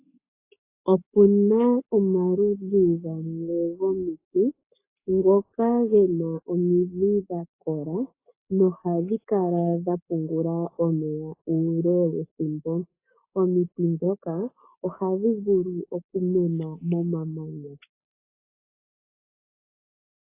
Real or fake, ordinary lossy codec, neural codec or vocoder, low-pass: fake; AAC, 24 kbps; codec, 44.1 kHz, 7.8 kbps, Pupu-Codec; 3.6 kHz